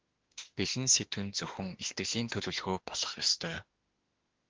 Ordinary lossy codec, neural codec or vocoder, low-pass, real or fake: Opus, 16 kbps; autoencoder, 48 kHz, 32 numbers a frame, DAC-VAE, trained on Japanese speech; 7.2 kHz; fake